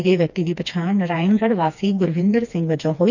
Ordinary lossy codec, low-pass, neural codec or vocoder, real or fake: none; 7.2 kHz; codec, 16 kHz, 2 kbps, FreqCodec, smaller model; fake